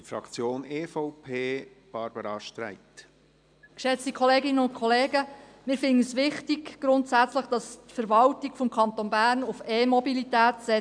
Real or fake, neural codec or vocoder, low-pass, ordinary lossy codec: real; none; 9.9 kHz; MP3, 96 kbps